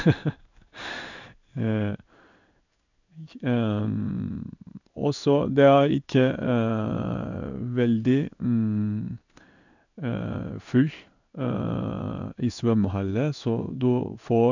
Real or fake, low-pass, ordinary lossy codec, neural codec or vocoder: fake; 7.2 kHz; none; codec, 16 kHz in and 24 kHz out, 1 kbps, XY-Tokenizer